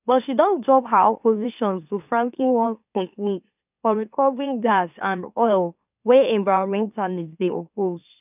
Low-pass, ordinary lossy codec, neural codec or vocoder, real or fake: 3.6 kHz; none; autoencoder, 44.1 kHz, a latent of 192 numbers a frame, MeloTTS; fake